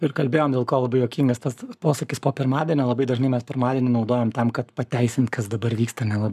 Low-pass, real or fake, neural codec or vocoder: 14.4 kHz; fake; codec, 44.1 kHz, 7.8 kbps, Pupu-Codec